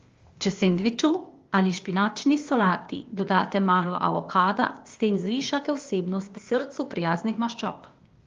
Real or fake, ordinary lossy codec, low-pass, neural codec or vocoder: fake; Opus, 32 kbps; 7.2 kHz; codec, 16 kHz, 0.8 kbps, ZipCodec